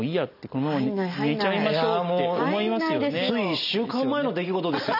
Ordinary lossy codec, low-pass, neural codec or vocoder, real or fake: none; 5.4 kHz; none; real